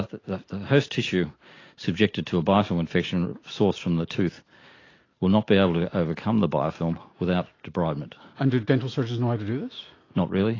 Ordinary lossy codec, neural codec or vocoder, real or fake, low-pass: AAC, 32 kbps; none; real; 7.2 kHz